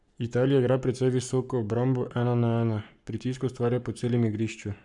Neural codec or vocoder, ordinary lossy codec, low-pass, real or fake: codec, 44.1 kHz, 7.8 kbps, Pupu-Codec; none; 10.8 kHz; fake